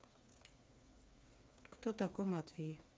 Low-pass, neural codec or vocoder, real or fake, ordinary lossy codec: none; codec, 16 kHz, 4 kbps, FreqCodec, smaller model; fake; none